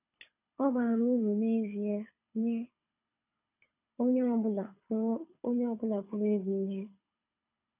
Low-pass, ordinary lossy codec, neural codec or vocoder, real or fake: 3.6 kHz; none; codec, 24 kHz, 6 kbps, HILCodec; fake